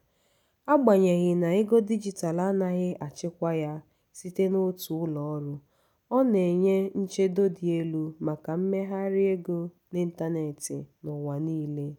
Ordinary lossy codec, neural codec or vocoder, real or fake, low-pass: none; none; real; 19.8 kHz